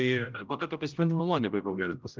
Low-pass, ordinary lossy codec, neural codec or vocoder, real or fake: 7.2 kHz; Opus, 24 kbps; codec, 16 kHz, 0.5 kbps, X-Codec, HuBERT features, trained on general audio; fake